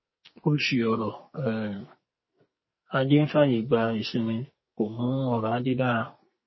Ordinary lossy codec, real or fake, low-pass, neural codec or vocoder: MP3, 24 kbps; fake; 7.2 kHz; codec, 44.1 kHz, 2.6 kbps, SNAC